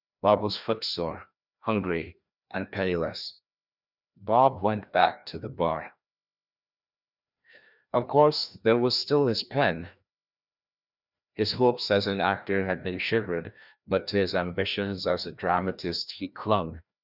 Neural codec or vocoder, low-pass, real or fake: codec, 16 kHz, 1 kbps, FreqCodec, larger model; 5.4 kHz; fake